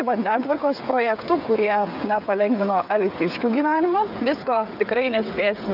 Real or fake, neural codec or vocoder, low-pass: fake; codec, 16 kHz, 8 kbps, FreqCodec, smaller model; 5.4 kHz